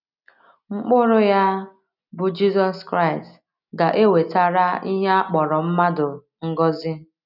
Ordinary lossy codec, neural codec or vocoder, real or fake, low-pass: none; none; real; 5.4 kHz